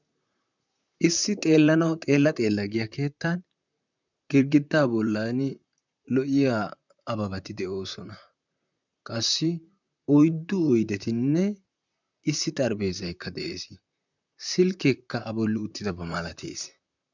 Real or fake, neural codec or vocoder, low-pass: fake; vocoder, 44.1 kHz, 128 mel bands, Pupu-Vocoder; 7.2 kHz